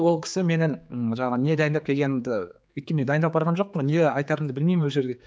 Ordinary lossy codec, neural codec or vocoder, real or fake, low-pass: none; codec, 16 kHz, 4 kbps, X-Codec, HuBERT features, trained on general audio; fake; none